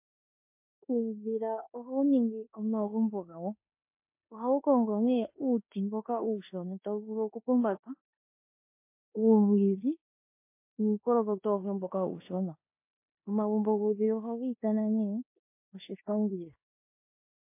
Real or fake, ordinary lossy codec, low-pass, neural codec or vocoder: fake; MP3, 24 kbps; 3.6 kHz; codec, 16 kHz in and 24 kHz out, 0.9 kbps, LongCat-Audio-Codec, four codebook decoder